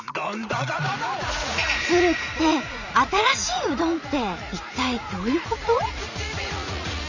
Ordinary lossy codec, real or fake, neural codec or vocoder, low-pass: none; fake; vocoder, 44.1 kHz, 80 mel bands, Vocos; 7.2 kHz